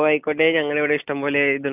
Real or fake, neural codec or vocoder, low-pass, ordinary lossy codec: real; none; 3.6 kHz; AAC, 32 kbps